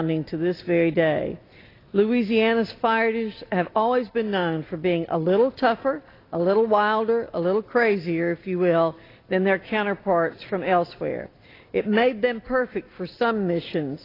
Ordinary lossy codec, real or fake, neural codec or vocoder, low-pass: AAC, 24 kbps; real; none; 5.4 kHz